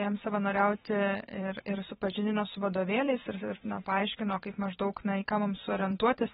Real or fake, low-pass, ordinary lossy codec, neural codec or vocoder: fake; 19.8 kHz; AAC, 16 kbps; vocoder, 44.1 kHz, 128 mel bands every 512 samples, BigVGAN v2